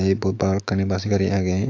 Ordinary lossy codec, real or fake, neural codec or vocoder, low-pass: AAC, 48 kbps; fake; autoencoder, 48 kHz, 128 numbers a frame, DAC-VAE, trained on Japanese speech; 7.2 kHz